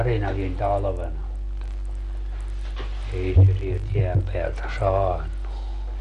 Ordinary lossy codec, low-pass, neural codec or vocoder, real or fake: MP3, 48 kbps; 14.4 kHz; none; real